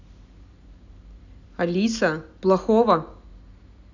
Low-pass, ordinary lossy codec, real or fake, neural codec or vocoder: 7.2 kHz; none; real; none